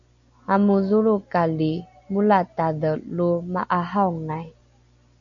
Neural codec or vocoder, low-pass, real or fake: none; 7.2 kHz; real